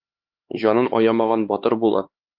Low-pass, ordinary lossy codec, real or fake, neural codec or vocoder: 5.4 kHz; Opus, 24 kbps; fake; codec, 16 kHz, 4 kbps, X-Codec, HuBERT features, trained on LibriSpeech